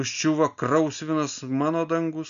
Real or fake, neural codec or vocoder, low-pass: real; none; 7.2 kHz